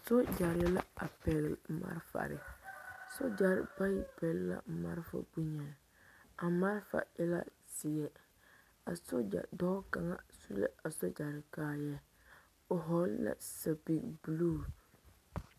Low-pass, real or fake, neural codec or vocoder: 14.4 kHz; real; none